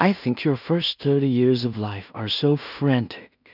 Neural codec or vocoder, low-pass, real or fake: codec, 16 kHz in and 24 kHz out, 0.4 kbps, LongCat-Audio-Codec, two codebook decoder; 5.4 kHz; fake